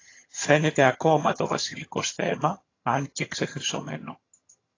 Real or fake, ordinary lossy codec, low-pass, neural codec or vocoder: fake; AAC, 32 kbps; 7.2 kHz; vocoder, 22.05 kHz, 80 mel bands, HiFi-GAN